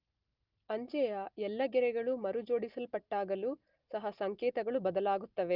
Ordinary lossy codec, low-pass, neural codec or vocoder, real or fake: Opus, 24 kbps; 5.4 kHz; none; real